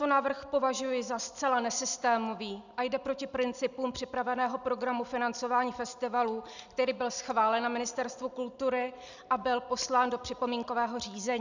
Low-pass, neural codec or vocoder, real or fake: 7.2 kHz; none; real